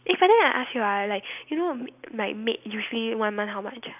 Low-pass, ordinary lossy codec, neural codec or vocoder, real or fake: 3.6 kHz; none; none; real